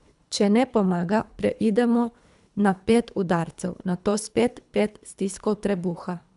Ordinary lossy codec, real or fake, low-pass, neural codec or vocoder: none; fake; 10.8 kHz; codec, 24 kHz, 3 kbps, HILCodec